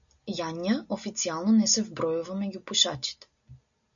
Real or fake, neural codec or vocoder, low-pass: real; none; 7.2 kHz